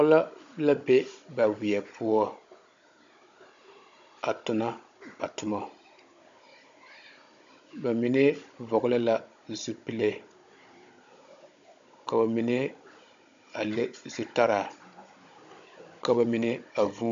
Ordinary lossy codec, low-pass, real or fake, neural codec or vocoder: AAC, 64 kbps; 7.2 kHz; fake; codec, 16 kHz, 16 kbps, FunCodec, trained on Chinese and English, 50 frames a second